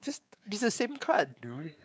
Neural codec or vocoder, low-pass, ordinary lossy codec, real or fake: codec, 16 kHz, 4 kbps, X-Codec, WavLM features, trained on Multilingual LibriSpeech; none; none; fake